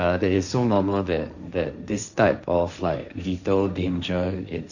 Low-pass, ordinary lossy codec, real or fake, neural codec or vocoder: 7.2 kHz; none; fake; codec, 16 kHz, 1.1 kbps, Voila-Tokenizer